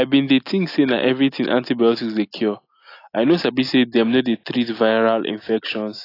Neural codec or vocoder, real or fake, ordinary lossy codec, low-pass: none; real; AAC, 32 kbps; 5.4 kHz